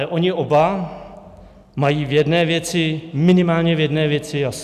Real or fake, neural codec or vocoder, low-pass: real; none; 14.4 kHz